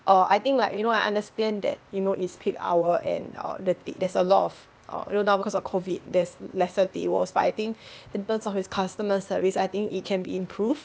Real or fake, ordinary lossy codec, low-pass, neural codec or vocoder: fake; none; none; codec, 16 kHz, 0.8 kbps, ZipCodec